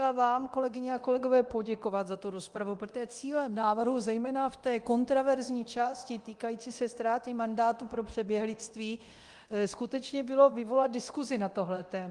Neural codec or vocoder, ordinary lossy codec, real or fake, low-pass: codec, 24 kHz, 0.9 kbps, DualCodec; Opus, 24 kbps; fake; 10.8 kHz